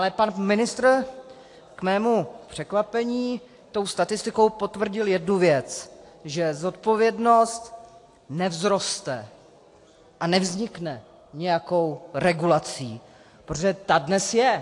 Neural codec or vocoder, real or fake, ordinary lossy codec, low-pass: none; real; AAC, 48 kbps; 10.8 kHz